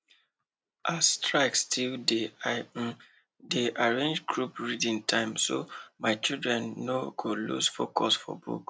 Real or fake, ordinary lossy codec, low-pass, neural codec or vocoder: real; none; none; none